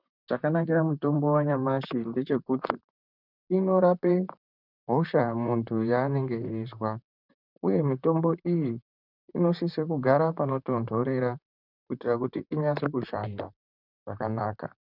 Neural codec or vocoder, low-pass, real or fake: vocoder, 44.1 kHz, 128 mel bands, Pupu-Vocoder; 5.4 kHz; fake